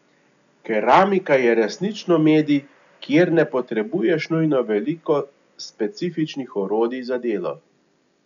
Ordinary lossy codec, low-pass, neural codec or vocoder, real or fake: none; 7.2 kHz; none; real